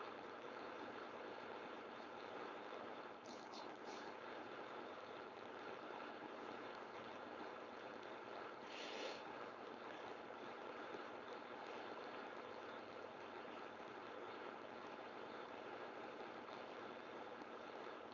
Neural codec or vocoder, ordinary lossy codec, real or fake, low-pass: codec, 16 kHz, 4.8 kbps, FACodec; none; fake; 7.2 kHz